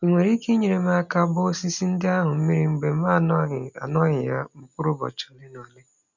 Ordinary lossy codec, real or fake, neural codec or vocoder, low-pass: none; real; none; 7.2 kHz